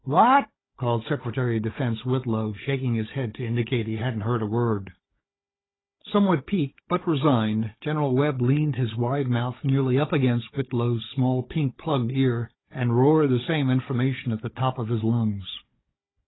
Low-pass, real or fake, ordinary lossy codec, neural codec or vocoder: 7.2 kHz; fake; AAC, 16 kbps; codec, 16 kHz, 16 kbps, FunCodec, trained on Chinese and English, 50 frames a second